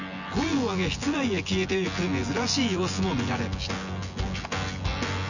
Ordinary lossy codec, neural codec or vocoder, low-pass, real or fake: none; vocoder, 24 kHz, 100 mel bands, Vocos; 7.2 kHz; fake